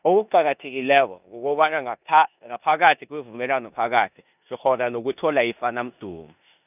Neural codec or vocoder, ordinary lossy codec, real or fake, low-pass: codec, 16 kHz in and 24 kHz out, 0.9 kbps, LongCat-Audio-Codec, four codebook decoder; none; fake; 3.6 kHz